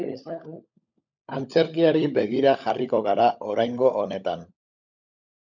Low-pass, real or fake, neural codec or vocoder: 7.2 kHz; fake; codec, 16 kHz, 16 kbps, FunCodec, trained on LibriTTS, 50 frames a second